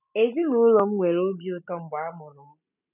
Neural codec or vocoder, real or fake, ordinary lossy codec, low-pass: none; real; none; 3.6 kHz